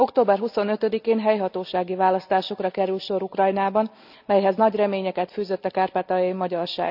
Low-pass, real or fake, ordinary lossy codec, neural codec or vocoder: 5.4 kHz; real; none; none